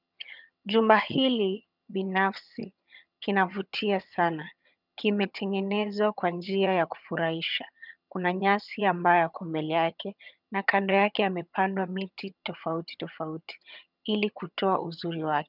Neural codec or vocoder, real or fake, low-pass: vocoder, 22.05 kHz, 80 mel bands, HiFi-GAN; fake; 5.4 kHz